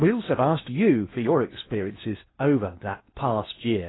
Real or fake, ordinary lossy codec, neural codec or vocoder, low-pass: fake; AAC, 16 kbps; codec, 16 kHz in and 24 kHz out, 0.6 kbps, FocalCodec, streaming, 4096 codes; 7.2 kHz